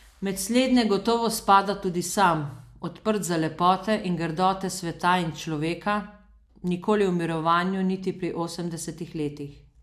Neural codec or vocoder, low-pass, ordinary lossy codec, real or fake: none; 14.4 kHz; AAC, 96 kbps; real